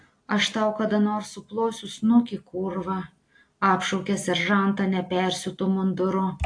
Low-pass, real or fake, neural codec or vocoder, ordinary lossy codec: 9.9 kHz; real; none; AAC, 48 kbps